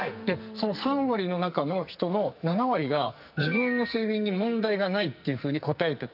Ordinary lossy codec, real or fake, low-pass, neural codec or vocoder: none; fake; 5.4 kHz; codec, 44.1 kHz, 2.6 kbps, SNAC